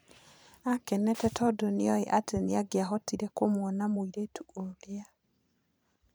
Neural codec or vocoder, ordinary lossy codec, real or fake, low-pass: vocoder, 44.1 kHz, 128 mel bands every 512 samples, BigVGAN v2; none; fake; none